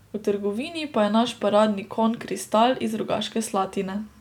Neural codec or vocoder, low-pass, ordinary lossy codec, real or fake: none; 19.8 kHz; none; real